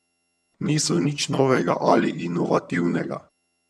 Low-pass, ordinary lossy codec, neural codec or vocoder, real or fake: none; none; vocoder, 22.05 kHz, 80 mel bands, HiFi-GAN; fake